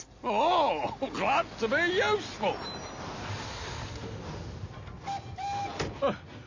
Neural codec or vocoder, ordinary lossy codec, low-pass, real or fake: none; AAC, 48 kbps; 7.2 kHz; real